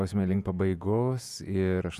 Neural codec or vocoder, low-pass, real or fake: vocoder, 48 kHz, 128 mel bands, Vocos; 14.4 kHz; fake